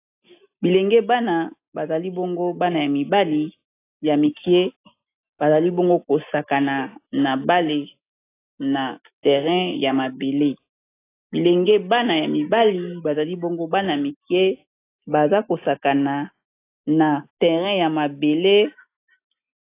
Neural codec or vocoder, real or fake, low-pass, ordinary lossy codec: none; real; 3.6 kHz; AAC, 24 kbps